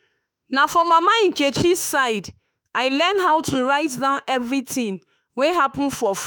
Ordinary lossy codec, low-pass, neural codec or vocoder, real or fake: none; none; autoencoder, 48 kHz, 32 numbers a frame, DAC-VAE, trained on Japanese speech; fake